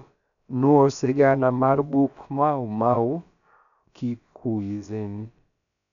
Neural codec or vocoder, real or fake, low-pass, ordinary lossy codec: codec, 16 kHz, about 1 kbps, DyCAST, with the encoder's durations; fake; 7.2 kHz; none